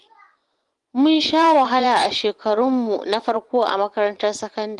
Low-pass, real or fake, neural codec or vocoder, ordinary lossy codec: 10.8 kHz; fake; vocoder, 24 kHz, 100 mel bands, Vocos; Opus, 24 kbps